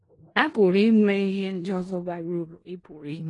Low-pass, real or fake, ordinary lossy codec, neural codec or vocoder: 10.8 kHz; fake; AAC, 32 kbps; codec, 16 kHz in and 24 kHz out, 0.4 kbps, LongCat-Audio-Codec, four codebook decoder